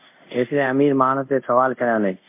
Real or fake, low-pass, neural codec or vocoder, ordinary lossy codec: fake; 3.6 kHz; codec, 24 kHz, 0.5 kbps, DualCodec; MP3, 32 kbps